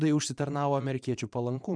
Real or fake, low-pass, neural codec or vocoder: fake; 9.9 kHz; vocoder, 22.05 kHz, 80 mel bands, Vocos